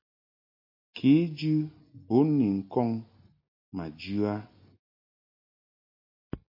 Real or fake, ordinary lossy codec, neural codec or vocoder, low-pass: real; MP3, 32 kbps; none; 5.4 kHz